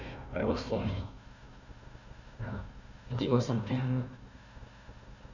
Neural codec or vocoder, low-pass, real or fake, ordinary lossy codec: codec, 16 kHz, 1 kbps, FunCodec, trained on Chinese and English, 50 frames a second; 7.2 kHz; fake; none